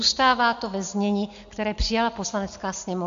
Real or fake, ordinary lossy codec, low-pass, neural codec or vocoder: real; AAC, 64 kbps; 7.2 kHz; none